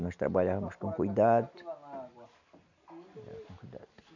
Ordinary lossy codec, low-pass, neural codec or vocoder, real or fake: none; 7.2 kHz; none; real